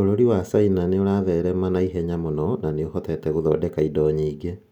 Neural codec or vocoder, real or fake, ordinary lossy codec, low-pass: none; real; none; 19.8 kHz